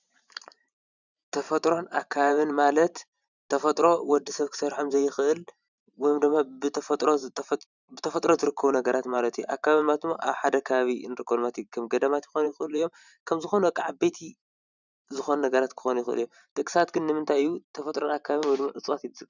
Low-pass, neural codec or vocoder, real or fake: 7.2 kHz; vocoder, 44.1 kHz, 128 mel bands every 512 samples, BigVGAN v2; fake